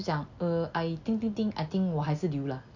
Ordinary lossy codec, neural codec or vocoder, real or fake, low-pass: none; none; real; 7.2 kHz